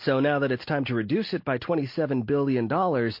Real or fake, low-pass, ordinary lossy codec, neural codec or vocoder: real; 5.4 kHz; MP3, 32 kbps; none